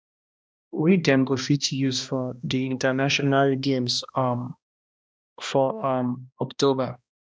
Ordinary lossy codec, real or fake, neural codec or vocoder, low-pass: none; fake; codec, 16 kHz, 1 kbps, X-Codec, HuBERT features, trained on balanced general audio; none